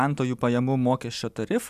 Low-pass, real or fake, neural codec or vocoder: 14.4 kHz; real; none